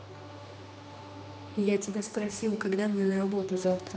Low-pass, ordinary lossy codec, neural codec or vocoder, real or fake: none; none; codec, 16 kHz, 2 kbps, X-Codec, HuBERT features, trained on general audio; fake